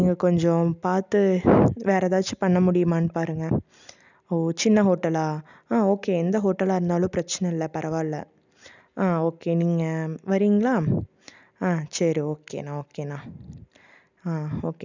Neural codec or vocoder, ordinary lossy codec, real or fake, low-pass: none; none; real; 7.2 kHz